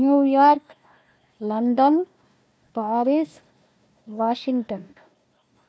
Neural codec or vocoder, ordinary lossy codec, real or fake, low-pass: codec, 16 kHz, 1 kbps, FunCodec, trained on Chinese and English, 50 frames a second; none; fake; none